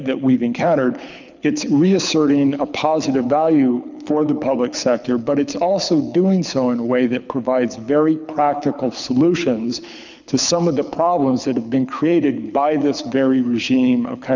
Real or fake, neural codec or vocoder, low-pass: fake; codec, 24 kHz, 6 kbps, HILCodec; 7.2 kHz